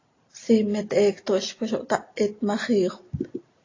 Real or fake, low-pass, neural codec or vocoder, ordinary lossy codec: real; 7.2 kHz; none; AAC, 32 kbps